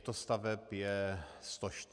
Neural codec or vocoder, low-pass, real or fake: none; 9.9 kHz; real